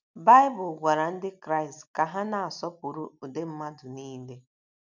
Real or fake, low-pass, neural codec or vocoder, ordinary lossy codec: real; 7.2 kHz; none; none